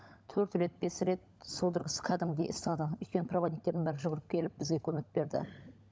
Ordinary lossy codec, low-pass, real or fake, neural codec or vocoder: none; none; fake; codec, 16 kHz, 16 kbps, FunCodec, trained on LibriTTS, 50 frames a second